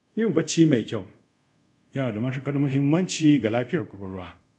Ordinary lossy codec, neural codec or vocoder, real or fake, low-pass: none; codec, 24 kHz, 0.5 kbps, DualCodec; fake; 10.8 kHz